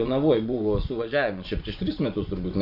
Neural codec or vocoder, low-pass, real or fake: vocoder, 24 kHz, 100 mel bands, Vocos; 5.4 kHz; fake